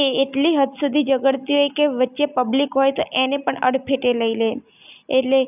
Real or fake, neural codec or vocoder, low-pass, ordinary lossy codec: real; none; 3.6 kHz; none